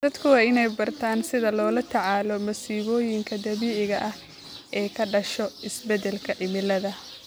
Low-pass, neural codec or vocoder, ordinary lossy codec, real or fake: none; none; none; real